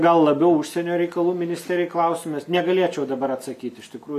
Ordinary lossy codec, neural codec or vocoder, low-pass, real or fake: MP3, 96 kbps; none; 14.4 kHz; real